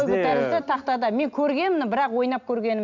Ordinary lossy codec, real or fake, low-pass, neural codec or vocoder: none; real; 7.2 kHz; none